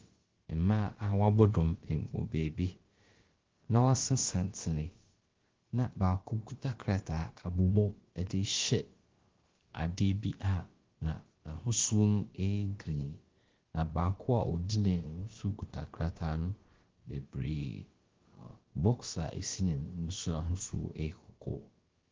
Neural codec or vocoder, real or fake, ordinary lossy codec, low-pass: codec, 16 kHz, about 1 kbps, DyCAST, with the encoder's durations; fake; Opus, 16 kbps; 7.2 kHz